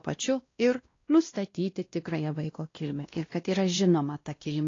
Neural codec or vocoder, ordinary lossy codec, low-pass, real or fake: codec, 16 kHz, 1 kbps, X-Codec, WavLM features, trained on Multilingual LibriSpeech; AAC, 32 kbps; 7.2 kHz; fake